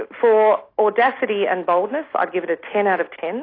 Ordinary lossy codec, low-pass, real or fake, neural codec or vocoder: AAC, 32 kbps; 5.4 kHz; real; none